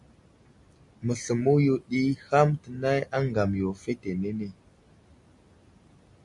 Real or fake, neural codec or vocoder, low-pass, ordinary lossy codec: real; none; 10.8 kHz; MP3, 96 kbps